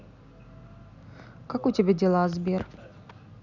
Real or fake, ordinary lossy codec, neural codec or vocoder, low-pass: real; none; none; 7.2 kHz